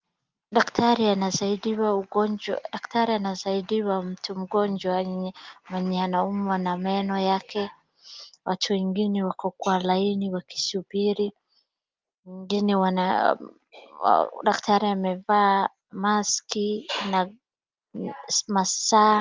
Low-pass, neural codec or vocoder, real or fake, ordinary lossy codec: 7.2 kHz; none; real; Opus, 24 kbps